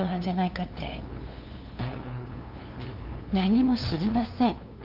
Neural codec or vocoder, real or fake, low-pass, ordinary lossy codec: codec, 16 kHz, 2 kbps, FunCodec, trained on LibriTTS, 25 frames a second; fake; 5.4 kHz; Opus, 32 kbps